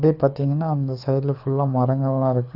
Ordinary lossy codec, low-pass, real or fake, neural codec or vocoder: none; 5.4 kHz; fake; codec, 24 kHz, 6 kbps, HILCodec